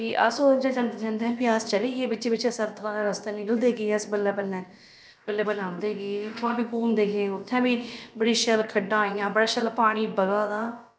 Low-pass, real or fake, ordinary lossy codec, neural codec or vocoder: none; fake; none; codec, 16 kHz, about 1 kbps, DyCAST, with the encoder's durations